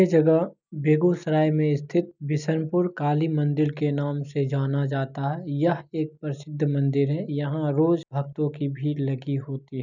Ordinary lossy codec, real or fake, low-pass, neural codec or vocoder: none; real; 7.2 kHz; none